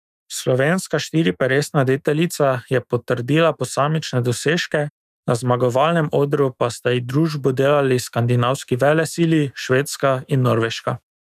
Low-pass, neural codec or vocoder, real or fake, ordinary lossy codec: 14.4 kHz; autoencoder, 48 kHz, 128 numbers a frame, DAC-VAE, trained on Japanese speech; fake; none